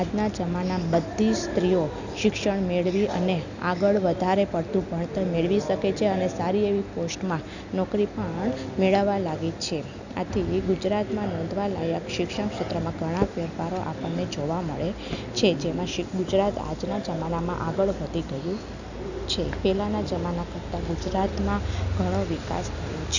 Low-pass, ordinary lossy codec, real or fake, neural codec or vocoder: 7.2 kHz; none; real; none